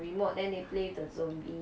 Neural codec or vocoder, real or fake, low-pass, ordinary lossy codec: none; real; none; none